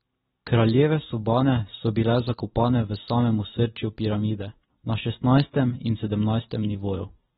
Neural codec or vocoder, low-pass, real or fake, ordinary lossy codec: none; 10.8 kHz; real; AAC, 16 kbps